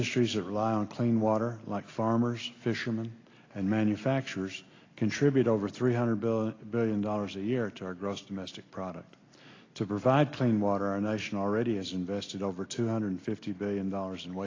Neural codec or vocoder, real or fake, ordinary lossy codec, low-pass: none; real; AAC, 32 kbps; 7.2 kHz